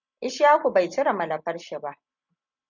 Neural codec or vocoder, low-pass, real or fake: none; 7.2 kHz; real